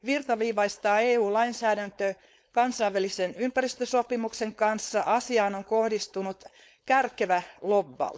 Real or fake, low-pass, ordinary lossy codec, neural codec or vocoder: fake; none; none; codec, 16 kHz, 4.8 kbps, FACodec